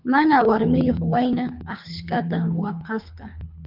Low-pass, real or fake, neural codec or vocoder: 5.4 kHz; fake; codec, 24 kHz, 3 kbps, HILCodec